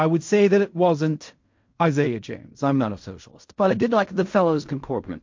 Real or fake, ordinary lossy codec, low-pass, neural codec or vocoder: fake; MP3, 48 kbps; 7.2 kHz; codec, 16 kHz in and 24 kHz out, 0.4 kbps, LongCat-Audio-Codec, fine tuned four codebook decoder